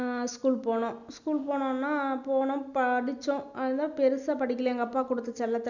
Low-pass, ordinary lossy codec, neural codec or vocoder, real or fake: 7.2 kHz; none; none; real